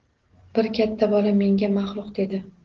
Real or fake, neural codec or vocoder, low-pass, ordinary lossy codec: real; none; 7.2 kHz; Opus, 16 kbps